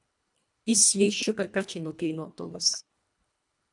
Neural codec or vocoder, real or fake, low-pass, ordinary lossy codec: codec, 24 kHz, 1.5 kbps, HILCodec; fake; 10.8 kHz; MP3, 96 kbps